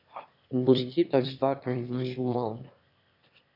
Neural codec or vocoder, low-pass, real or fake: autoencoder, 22.05 kHz, a latent of 192 numbers a frame, VITS, trained on one speaker; 5.4 kHz; fake